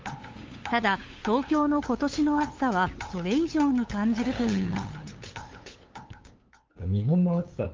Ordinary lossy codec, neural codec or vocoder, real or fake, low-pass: Opus, 32 kbps; codec, 16 kHz, 8 kbps, FunCodec, trained on LibriTTS, 25 frames a second; fake; 7.2 kHz